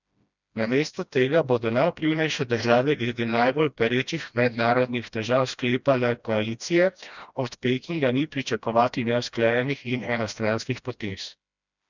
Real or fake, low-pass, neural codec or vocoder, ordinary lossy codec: fake; 7.2 kHz; codec, 16 kHz, 1 kbps, FreqCodec, smaller model; none